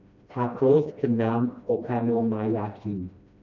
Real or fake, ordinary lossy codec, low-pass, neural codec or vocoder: fake; none; 7.2 kHz; codec, 16 kHz, 1 kbps, FreqCodec, smaller model